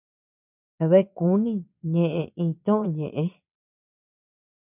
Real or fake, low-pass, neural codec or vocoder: fake; 3.6 kHz; vocoder, 22.05 kHz, 80 mel bands, Vocos